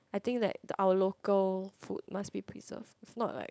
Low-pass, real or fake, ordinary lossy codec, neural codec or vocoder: none; fake; none; codec, 16 kHz, 4.8 kbps, FACodec